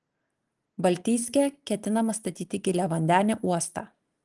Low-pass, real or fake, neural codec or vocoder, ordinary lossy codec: 10.8 kHz; real; none; Opus, 24 kbps